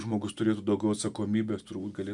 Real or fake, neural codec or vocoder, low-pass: real; none; 10.8 kHz